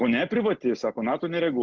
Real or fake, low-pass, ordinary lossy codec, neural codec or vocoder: real; 7.2 kHz; Opus, 16 kbps; none